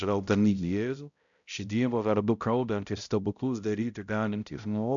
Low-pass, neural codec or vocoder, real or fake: 7.2 kHz; codec, 16 kHz, 0.5 kbps, X-Codec, HuBERT features, trained on balanced general audio; fake